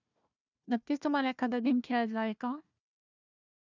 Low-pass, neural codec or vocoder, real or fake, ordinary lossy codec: 7.2 kHz; codec, 16 kHz, 1 kbps, FunCodec, trained on Chinese and English, 50 frames a second; fake; none